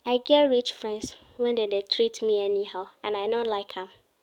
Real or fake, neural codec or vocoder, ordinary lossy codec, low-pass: fake; codec, 44.1 kHz, 7.8 kbps, DAC; none; 19.8 kHz